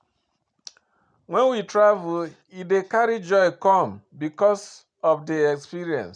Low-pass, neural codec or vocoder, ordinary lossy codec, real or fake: 9.9 kHz; none; none; real